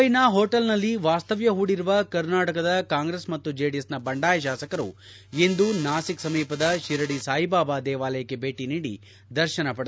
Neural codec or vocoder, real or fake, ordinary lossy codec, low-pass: none; real; none; none